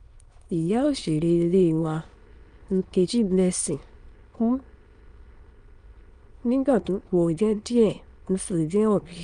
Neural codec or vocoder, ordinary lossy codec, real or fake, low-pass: autoencoder, 22.05 kHz, a latent of 192 numbers a frame, VITS, trained on many speakers; Opus, 32 kbps; fake; 9.9 kHz